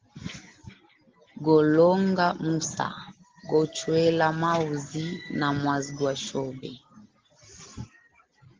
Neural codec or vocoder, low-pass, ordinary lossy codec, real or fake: none; 7.2 kHz; Opus, 16 kbps; real